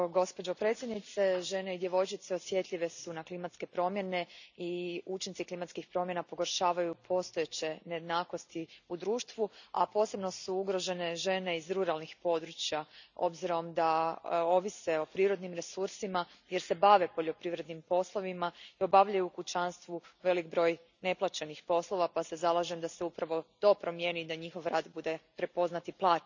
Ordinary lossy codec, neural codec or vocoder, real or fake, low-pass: none; none; real; 7.2 kHz